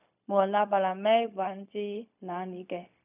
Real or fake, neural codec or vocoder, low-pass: fake; codec, 16 kHz, 0.4 kbps, LongCat-Audio-Codec; 3.6 kHz